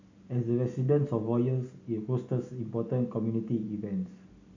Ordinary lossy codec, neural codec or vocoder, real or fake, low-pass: AAC, 48 kbps; none; real; 7.2 kHz